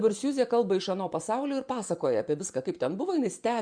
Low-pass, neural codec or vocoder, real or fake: 9.9 kHz; vocoder, 24 kHz, 100 mel bands, Vocos; fake